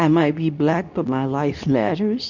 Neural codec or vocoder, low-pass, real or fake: codec, 24 kHz, 0.9 kbps, WavTokenizer, medium speech release version 2; 7.2 kHz; fake